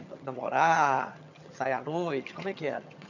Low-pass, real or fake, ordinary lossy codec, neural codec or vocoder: 7.2 kHz; fake; none; vocoder, 22.05 kHz, 80 mel bands, HiFi-GAN